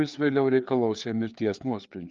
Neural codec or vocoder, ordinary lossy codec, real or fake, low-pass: codec, 16 kHz, 4 kbps, FreqCodec, larger model; Opus, 32 kbps; fake; 7.2 kHz